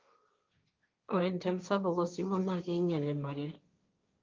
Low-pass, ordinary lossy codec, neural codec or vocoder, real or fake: 7.2 kHz; Opus, 24 kbps; codec, 16 kHz, 1.1 kbps, Voila-Tokenizer; fake